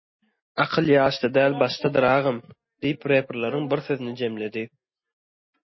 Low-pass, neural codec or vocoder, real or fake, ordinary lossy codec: 7.2 kHz; none; real; MP3, 24 kbps